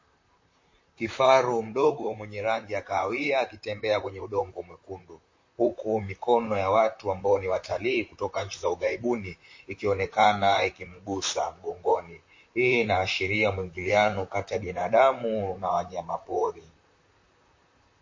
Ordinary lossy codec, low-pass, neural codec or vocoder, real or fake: MP3, 32 kbps; 7.2 kHz; vocoder, 44.1 kHz, 128 mel bands, Pupu-Vocoder; fake